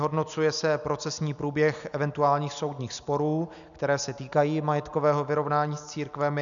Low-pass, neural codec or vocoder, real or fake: 7.2 kHz; none; real